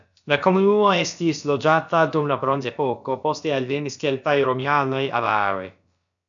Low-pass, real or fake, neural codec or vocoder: 7.2 kHz; fake; codec, 16 kHz, about 1 kbps, DyCAST, with the encoder's durations